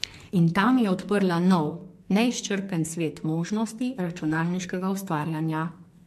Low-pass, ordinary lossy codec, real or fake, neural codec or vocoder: 14.4 kHz; MP3, 64 kbps; fake; codec, 44.1 kHz, 2.6 kbps, SNAC